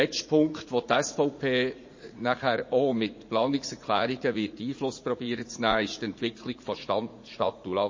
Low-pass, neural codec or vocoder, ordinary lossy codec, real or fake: 7.2 kHz; vocoder, 44.1 kHz, 80 mel bands, Vocos; MP3, 32 kbps; fake